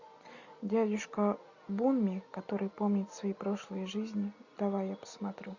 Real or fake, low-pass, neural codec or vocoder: real; 7.2 kHz; none